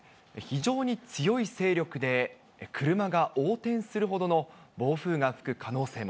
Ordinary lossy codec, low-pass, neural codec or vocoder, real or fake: none; none; none; real